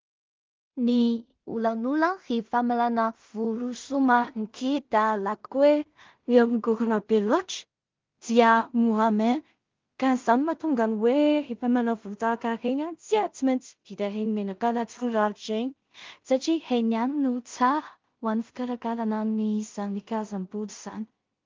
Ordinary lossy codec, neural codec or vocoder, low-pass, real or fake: Opus, 32 kbps; codec, 16 kHz in and 24 kHz out, 0.4 kbps, LongCat-Audio-Codec, two codebook decoder; 7.2 kHz; fake